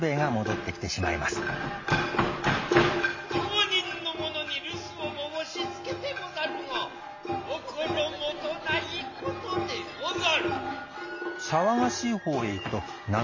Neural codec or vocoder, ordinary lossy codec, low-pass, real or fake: none; MP3, 32 kbps; 7.2 kHz; real